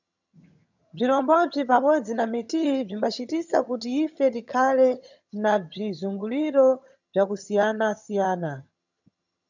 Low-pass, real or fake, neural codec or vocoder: 7.2 kHz; fake; vocoder, 22.05 kHz, 80 mel bands, HiFi-GAN